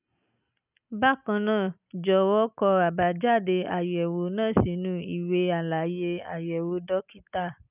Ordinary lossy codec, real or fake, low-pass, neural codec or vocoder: none; real; 3.6 kHz; none